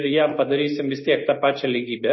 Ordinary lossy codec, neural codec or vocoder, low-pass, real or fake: MP3, 24 kbps; none; 7.2 kHz; real